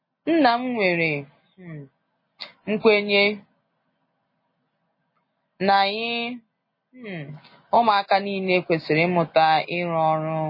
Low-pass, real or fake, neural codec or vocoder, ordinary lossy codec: 5.4 kHz; real; none; MP3, 24 kbps